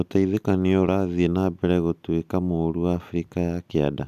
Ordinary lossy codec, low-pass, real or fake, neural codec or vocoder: none; 14.4 kHz; real; none